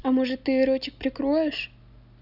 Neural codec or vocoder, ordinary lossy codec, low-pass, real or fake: none; none; 5.4 kHz; real